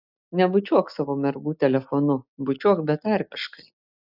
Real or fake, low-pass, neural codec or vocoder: real; 5.4 kHz; none